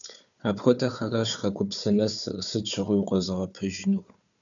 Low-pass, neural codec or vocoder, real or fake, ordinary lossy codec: 7.2 kHz; codec, 16 kHz, 4 kbps, FunCodec, trained on Chinese and English, 50 frames a second; fake; MP3, 96 kbps